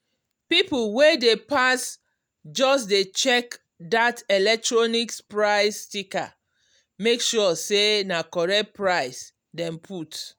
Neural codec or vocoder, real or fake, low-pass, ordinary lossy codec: none; real; none; none